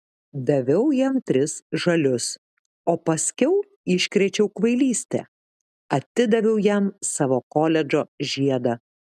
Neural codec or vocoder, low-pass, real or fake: none; 14.4 kHz; real